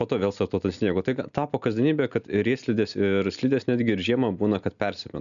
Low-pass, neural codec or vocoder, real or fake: 7.2 kHz; none; real